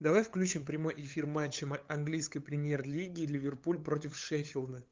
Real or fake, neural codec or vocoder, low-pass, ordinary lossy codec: fake; codec, 16 kHz, 8 kbps, FunCodec, trained on LibriTTS, 25 frames a second; 7.2 kHz; Opus, 32 kbps